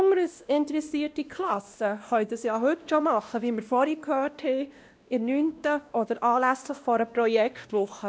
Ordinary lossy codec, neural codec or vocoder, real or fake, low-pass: none; codec, 16 kHz, 1 kbps, X-Codec, WavLM features, trained on Multilingual LibriSpeech; fake; none